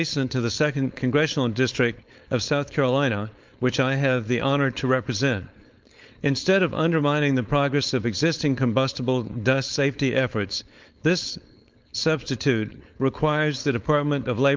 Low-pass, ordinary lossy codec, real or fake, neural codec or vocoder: 7.2 kHz; Opus, 24 kbps; fake; codec, 16 kHz, 4.8 kbps, FACodec